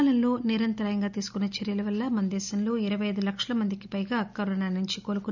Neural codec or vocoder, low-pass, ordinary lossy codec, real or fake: none; 7.2 kHz; none; real